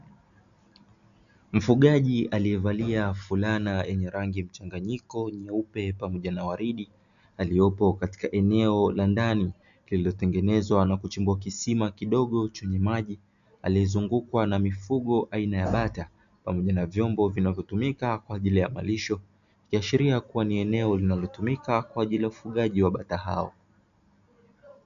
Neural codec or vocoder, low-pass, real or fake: none; 7.2 kHz; real